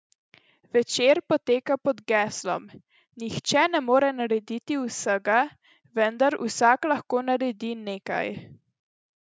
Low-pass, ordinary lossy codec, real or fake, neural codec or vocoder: none; none; real; none